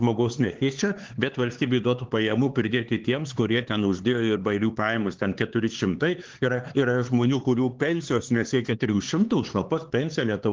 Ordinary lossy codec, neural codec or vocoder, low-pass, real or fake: Opus, 16 kbps; codec, 16 kHz, 4 kbps, X-Codec, HuBERT features, trained on LibriSpeech; 7.2 kHz; fake